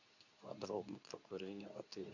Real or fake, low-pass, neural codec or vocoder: fake; 7.2 kHz; codec, 24 kHz, 0.9 kbps, WavTokenizer, medium speech release version 2